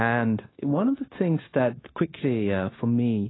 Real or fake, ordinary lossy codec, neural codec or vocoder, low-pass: fake; AAC, 16 kbps; codec, 16 kHz, 0.9 kbps, LongCat-Audio-Codec; 7.2 kHz